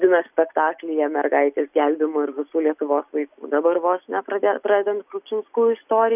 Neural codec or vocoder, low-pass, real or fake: none; 3.6 kHz; real